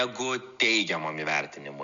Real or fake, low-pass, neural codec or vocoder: real; 7.2 kHz; none